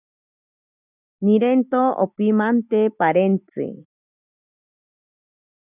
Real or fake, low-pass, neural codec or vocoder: real; 3.6 kHz; none